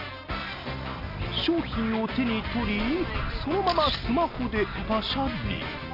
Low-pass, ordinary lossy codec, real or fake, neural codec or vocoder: 5.4 kHz; none; real; none